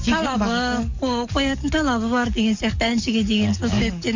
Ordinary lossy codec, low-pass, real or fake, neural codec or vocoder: MP3, 64 kbps; 7.2 kHz; fake; codec, 44.1 kHz, 7.8 kbps, DAC